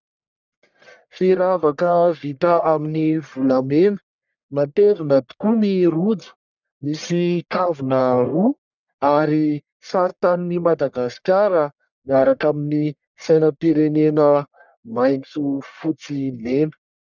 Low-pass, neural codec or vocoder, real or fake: 7.2 kHz; codec, 44.1 kHz, 1.7 kbps, Pupu-Codec; fake